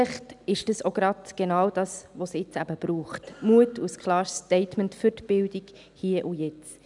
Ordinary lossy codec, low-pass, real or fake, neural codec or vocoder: none; 10.8 kHz; real; none